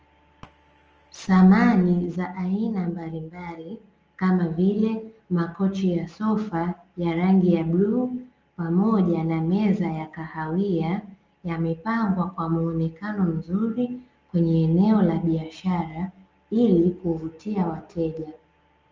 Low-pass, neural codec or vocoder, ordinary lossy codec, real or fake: 7.2 kHz; none; Opus, 24 kbps; real